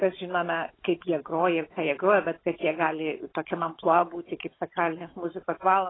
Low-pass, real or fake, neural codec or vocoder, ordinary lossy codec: 7.2 kHz; fake; codec, 16 kHz, 16 kbps, FunCodec, trained on Chinese and English, 50 frames a second; AAC, 16 kbps